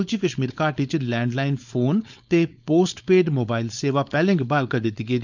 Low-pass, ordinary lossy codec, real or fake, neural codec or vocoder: 7.2 kHz; none; fake; codec, 16 kHz, 4.8 kbps, FACodec